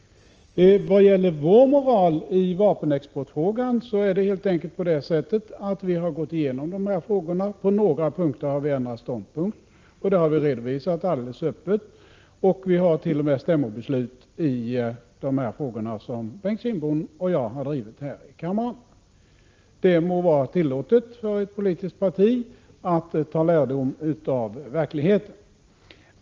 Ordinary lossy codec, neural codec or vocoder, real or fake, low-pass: Opus, 24 kbps; none; real; 7.2 kHz